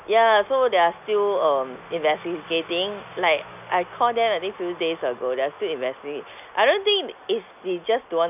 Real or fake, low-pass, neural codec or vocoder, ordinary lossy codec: real; 3.6 kHz; none; none